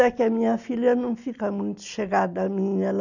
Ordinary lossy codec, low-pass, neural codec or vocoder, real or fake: none; 7.2 kHz; none; real